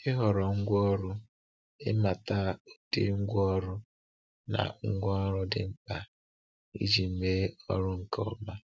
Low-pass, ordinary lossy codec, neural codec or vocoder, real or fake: none; none; none; real